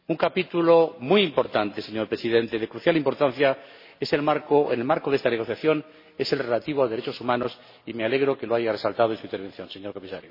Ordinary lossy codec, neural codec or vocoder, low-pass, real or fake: MP3, 24 kbps; none; 5.4 kHz; real